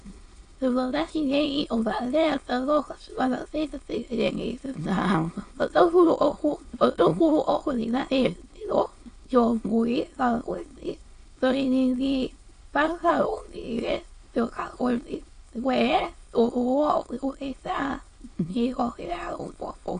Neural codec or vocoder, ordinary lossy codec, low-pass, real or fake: autoencoder, 22.05 kHz, a latent of 192 numbers a frame, VITS, trained on many speakers; AAC, 48 kbps; 9.9 kHz; fake